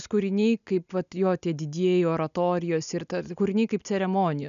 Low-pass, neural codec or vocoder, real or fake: 7.2 kHz; none; real